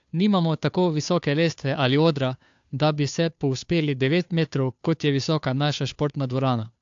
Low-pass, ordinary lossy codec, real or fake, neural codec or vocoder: 7.2 kHz; AAC, 64 kbps; fake; codec, 16 kHz, 2 kbps, FunCodec, trained on Chinese and English, 25 frames a second